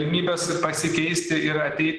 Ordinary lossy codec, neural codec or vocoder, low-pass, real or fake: Opus, 16 kbps; none; 10.8 kHz; real